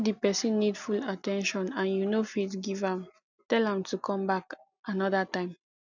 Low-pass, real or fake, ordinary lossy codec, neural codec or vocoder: 7.2 kHz; real; none; none